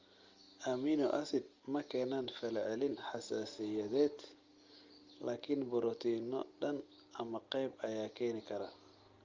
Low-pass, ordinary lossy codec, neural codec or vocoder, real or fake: 7.2 kHz; Opus, 32 kbps; none; real